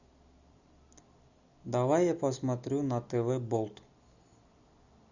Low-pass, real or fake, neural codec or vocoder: 7.2 kHz; real; none